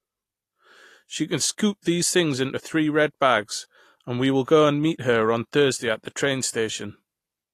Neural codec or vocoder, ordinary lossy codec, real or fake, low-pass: none; AAC, 48 kbps; real; 14.4 kHz